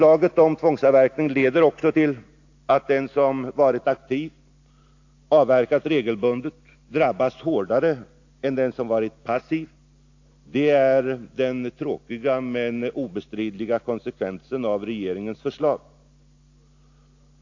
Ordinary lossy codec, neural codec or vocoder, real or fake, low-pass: AAC, 48 kbps; none; real; 7.2 kHz